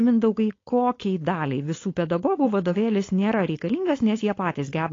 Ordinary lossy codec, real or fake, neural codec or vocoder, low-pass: AAC, 32 kbps; fake; codec, 16 kHz, 4.8 kbps, FACodec; 7.2 kHz